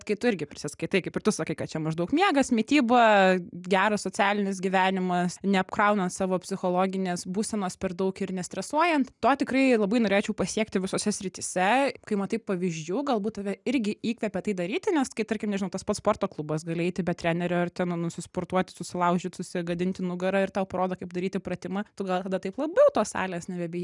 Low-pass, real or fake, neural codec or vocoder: 10.8 kHz; real; none